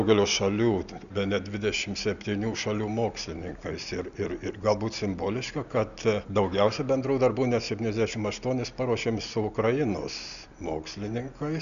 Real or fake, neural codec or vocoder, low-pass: real; none; 7.2 kHz